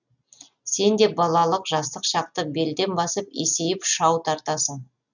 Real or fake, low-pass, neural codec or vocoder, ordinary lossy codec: real; 7.2 kHz; none; none